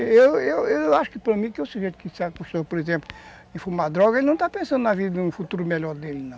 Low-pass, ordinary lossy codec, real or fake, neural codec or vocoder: none; none; real; none